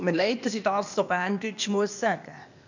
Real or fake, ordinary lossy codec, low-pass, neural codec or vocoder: fake; none; 7.2 kHz; codec, 16 kHz, 0.8 kbps, ZipCodec